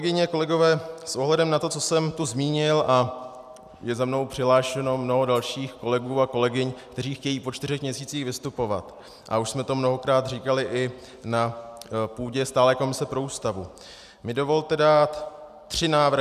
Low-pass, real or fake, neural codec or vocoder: 14.4 kHz; real; none